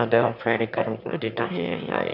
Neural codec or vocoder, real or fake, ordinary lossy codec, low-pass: autoencoder, 22.05 kHz, a latent of 192 numbers a frame, VITS, trained on one speaker; fake; none; 5.4 kHz